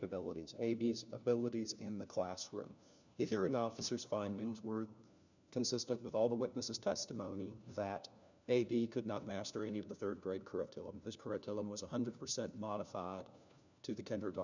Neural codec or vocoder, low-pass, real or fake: codec, 16 kHz, 1 kbps, FunCodec, trained on LibriTTS, 50 frames a second; 7.2 kHz; fake